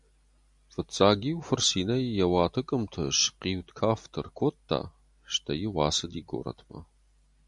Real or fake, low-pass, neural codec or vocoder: real; 10.8 kHz; none